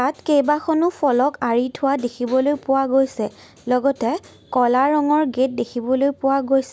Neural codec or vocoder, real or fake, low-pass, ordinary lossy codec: none; real; none; none